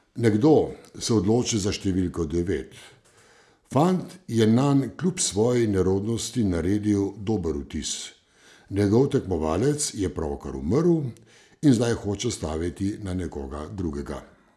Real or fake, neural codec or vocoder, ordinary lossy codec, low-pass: real; none; none; none